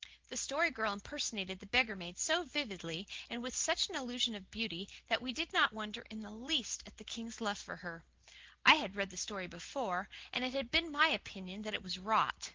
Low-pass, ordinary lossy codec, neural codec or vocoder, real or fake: 7.2 kHz; Opus, 16 kbps; none; real